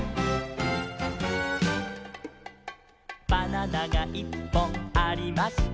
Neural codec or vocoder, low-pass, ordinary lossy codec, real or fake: none; none; none; real